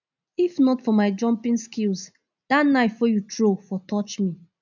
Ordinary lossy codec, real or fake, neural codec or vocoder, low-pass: none; real; none; 7.2 kHz